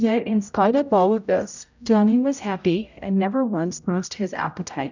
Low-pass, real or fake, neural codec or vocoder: 7.2 kHz; fake; codec, 16 kHz, 0.5 kbps, X-Codec, HuBERT features, trained on general audio